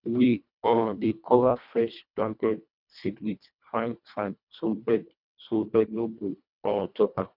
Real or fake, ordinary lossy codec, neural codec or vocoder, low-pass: fake; none; codec, 24 kHz, 1.5 kbps, HILCodec; 5.4 kHz